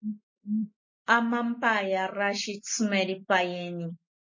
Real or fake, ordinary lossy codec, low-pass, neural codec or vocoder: real; MP3, 32 kbps; 7.2 kHz; none